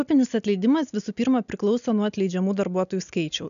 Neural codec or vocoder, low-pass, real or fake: none; 7.2 kHz; real